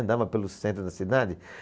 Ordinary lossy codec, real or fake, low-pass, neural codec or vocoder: none; real; none; none